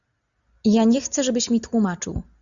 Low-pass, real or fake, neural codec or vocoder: 7.2 kHz; real; none